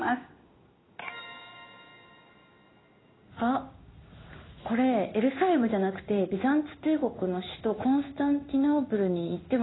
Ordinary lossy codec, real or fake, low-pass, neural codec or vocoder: AAC, 16 kbps; fake; 7.2 kHz; codec, 16 kHz in and 24 kHz out, 1 kbps, XY-Tokenizer